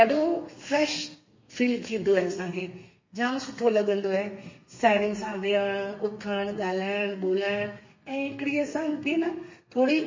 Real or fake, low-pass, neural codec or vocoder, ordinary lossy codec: fake; 7.2 kHz; codec, 32 kHz, 1.9 kbps, SNAC; MP3, 32 kbps